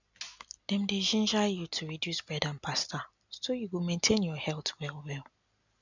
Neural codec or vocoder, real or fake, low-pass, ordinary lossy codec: none; real; 7.2 kHz; none